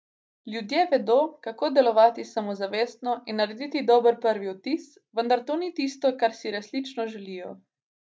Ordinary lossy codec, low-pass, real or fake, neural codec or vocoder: none; none; real; none